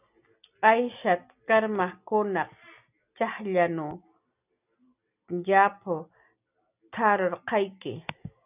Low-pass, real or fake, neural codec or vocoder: 3.6 kHz; real; none